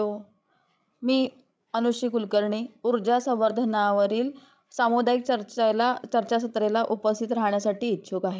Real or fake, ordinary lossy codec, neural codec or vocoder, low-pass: fake; none; codec, 16 kHz, 16 kbps, FreqCodec, larger model; none